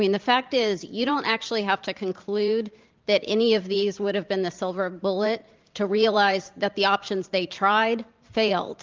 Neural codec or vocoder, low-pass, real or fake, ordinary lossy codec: vocoder, 44.1 kHz, 128 mel bands every 512 samples, BigVGAN v2; 7.2 kHz; fake; Opus, 24 kbps